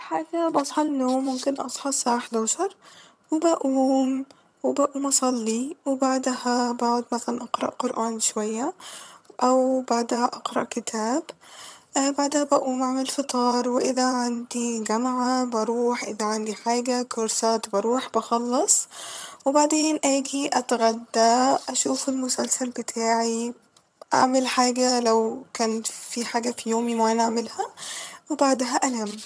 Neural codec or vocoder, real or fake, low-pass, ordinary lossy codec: vocoder, 22.05 kHz, 80 mel bands, HiFi-GAN; fake; none; none